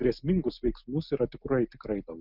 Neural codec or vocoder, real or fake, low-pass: none; real; 5.4 kHz